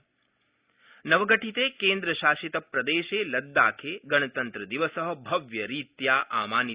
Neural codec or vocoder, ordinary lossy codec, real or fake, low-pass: none; Opus, 64 kbps; real; 3.6 kHz